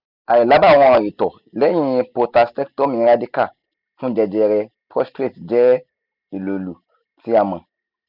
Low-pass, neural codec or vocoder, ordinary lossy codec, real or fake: 5.4 kHz; none; none; real